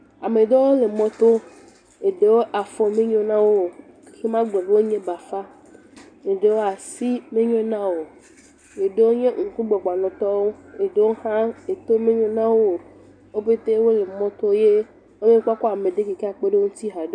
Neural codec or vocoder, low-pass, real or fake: none; 9.9 kHz; real